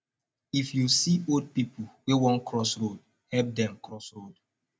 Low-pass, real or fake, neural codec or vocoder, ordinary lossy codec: none; real; none; none